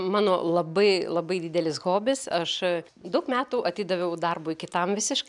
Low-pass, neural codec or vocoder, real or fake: 10.8 kHz; none; real